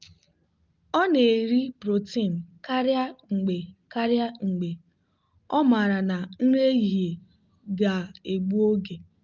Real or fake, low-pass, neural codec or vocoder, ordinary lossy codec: real; 7.2 kHz; none; Opus, 24 kbps